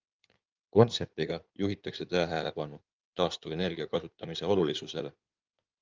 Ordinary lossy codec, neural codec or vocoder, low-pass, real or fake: Opus, 24 kbps; codec, 16 kHz in and 24 kHz out, 2.2 kbps, FireRedTTS-2 codec; 7.2 kHz; fake